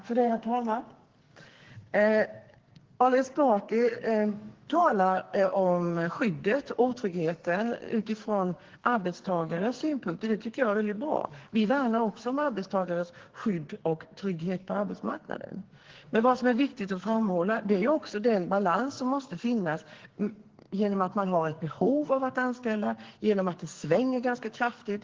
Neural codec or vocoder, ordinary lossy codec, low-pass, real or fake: codec, 44.1 kHz, 2.6 kbps, SNAC; Opus, 16 kbps; 7.2 kHz; fake